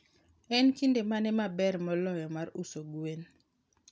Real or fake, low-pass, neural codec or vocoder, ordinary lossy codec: real; none; none; none